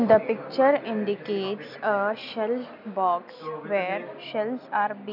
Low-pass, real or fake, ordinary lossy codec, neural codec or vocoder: 5.4 kHz; real; none; none